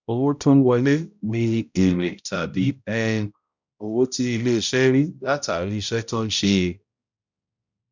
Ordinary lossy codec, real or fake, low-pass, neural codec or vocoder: none; fake; 7.2 kHz; codec, 16 kHz, 0.5 kbps, X-Codec, HuBERT features, trained on balanced general audio